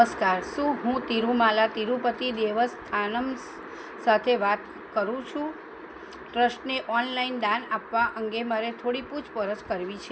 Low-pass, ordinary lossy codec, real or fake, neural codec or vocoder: none; none; real; none